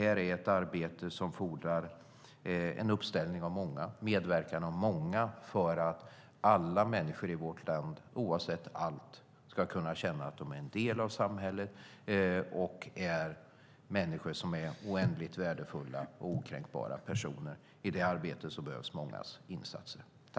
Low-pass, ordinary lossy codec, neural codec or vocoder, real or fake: none; none; none; real